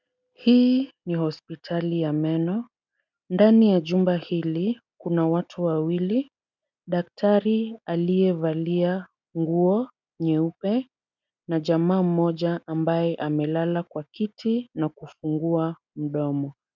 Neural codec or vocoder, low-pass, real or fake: none; 7.2 kHz; real